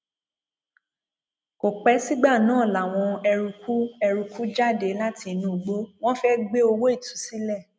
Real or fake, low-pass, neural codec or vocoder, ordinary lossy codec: real; none; none; none